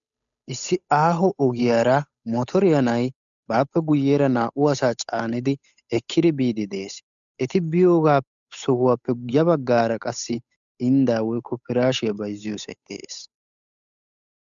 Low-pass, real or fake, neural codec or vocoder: 7.2 kHz; fake; codec, 16 kHz, 8 kbps, FunCodec, trained on Chinese and English, 25 frames a second